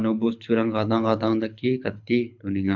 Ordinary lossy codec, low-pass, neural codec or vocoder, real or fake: MP3, 64 kbps; 7.2 kHz; codec, 24 kHz, 6 kbps, HILCodec; fake